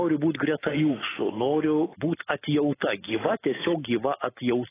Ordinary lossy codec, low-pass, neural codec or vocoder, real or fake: AAC, 16 kbps; 3.6 kHz; none; real